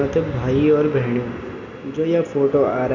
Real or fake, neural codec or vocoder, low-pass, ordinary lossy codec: real; none; 7.2 kHz; none